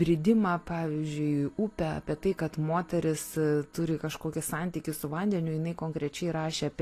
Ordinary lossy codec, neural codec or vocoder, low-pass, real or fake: AAC, 48 kbps; none; 14.4 kHz; real